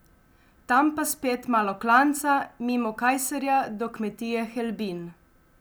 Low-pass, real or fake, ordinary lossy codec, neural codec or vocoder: none; real; none; none